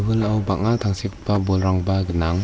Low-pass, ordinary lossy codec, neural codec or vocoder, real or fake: none; none; none; real